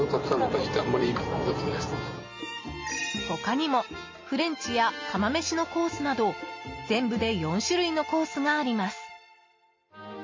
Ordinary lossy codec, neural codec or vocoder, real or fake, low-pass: MP3, 32 kbps; none; real; 7.2 kHz